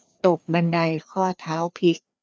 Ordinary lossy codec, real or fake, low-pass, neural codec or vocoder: none; fake; none; codec, 16 kHz, 2 kbps, FreqCodec, larger model